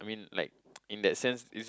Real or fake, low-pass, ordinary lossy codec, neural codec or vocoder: real; none; none; none